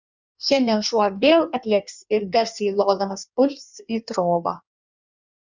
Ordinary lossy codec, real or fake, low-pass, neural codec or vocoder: Opus, 64 kbps; fake; 7.2 kHz; codec, 16 kHz in and 24 kHz out, 1.1 kbps, FireRedTTS-2 codec